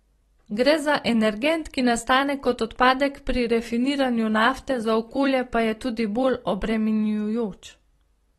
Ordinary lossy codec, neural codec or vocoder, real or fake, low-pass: AAC, 32 kbps; vocoder, 44.1 kHz, 128 mel bands every 256 samples, BigVGAN v2; fake; 19.8 kHz